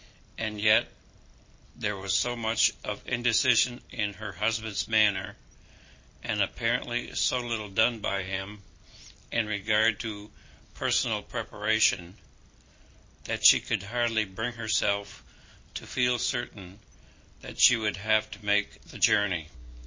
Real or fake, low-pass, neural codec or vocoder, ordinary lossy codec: real; 7.2 kHz; none; MP3, 32 kbps